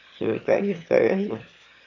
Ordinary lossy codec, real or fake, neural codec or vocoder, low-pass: AAC, 48 kbps; fake; autoencoder, 22.05 kHz, a latent of 192 numbers a frame, VITS, trained on one speaker; 7.2 kHz